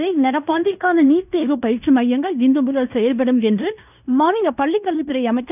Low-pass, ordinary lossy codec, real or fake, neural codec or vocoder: 3.6 kHz; none; fake; codec, 16 kHz in and 24 kHz out, 0.9 kbps, LongCat-Audio-Codec, fine tuned four codebook decoder